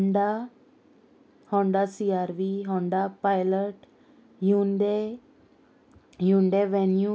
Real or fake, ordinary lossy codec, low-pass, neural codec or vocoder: real; none; none; none